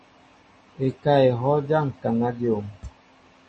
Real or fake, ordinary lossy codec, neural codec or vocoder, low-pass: real; MP3, 32 kbps; none; 10.8 kHz